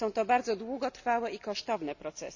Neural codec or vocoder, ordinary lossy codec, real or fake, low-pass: none; none; real; 7.2 kHz